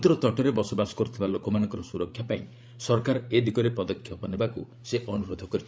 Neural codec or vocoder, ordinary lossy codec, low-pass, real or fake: codec, 16 kHz, 8 kbps, FreqCodec, larger model; none; none; fake